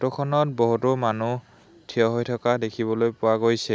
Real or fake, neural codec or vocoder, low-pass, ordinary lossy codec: real; none; none; none